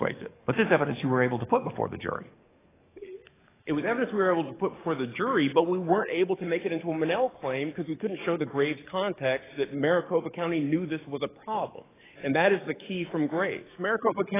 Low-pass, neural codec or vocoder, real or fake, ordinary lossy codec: 3.6 kHz; codec, 44.1 kHz, 7.8 kbps, DAC; fake; AAC, 16 kbps